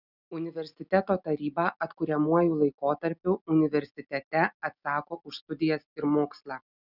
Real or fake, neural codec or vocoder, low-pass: real; none; 5.4 kHz